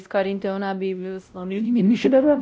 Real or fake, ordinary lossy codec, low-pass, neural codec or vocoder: fake; none; none; codec, 16 kHz, 0.5 kbps, X-Codec, WavLM features, trained on Multilingual LibriSpeech